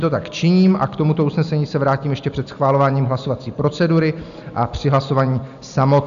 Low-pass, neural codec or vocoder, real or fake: 7.2 kHz; none; real